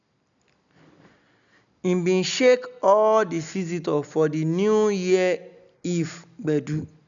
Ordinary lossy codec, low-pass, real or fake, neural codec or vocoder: none; 7.2 kHz; real; none